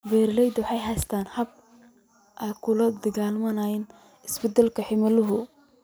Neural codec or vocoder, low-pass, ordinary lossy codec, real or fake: none; none; none; real